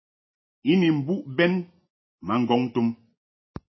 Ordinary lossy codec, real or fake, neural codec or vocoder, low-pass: MP3, 24 kbps; real; none; 7.2 kHz